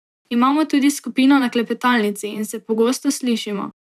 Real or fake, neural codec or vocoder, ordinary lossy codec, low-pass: fake; vocoder, 44.1 kHz, 128 mel bands every 512 samples, BigVGAN v2; none; 14.4 kHz